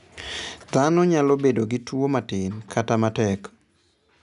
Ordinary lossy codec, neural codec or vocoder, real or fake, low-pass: none; none; real; 10.8 kHz